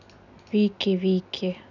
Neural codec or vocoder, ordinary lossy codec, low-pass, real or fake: none; none; 7.2 kHz; real